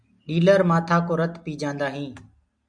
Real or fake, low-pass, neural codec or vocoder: real; 9.9 kHz; none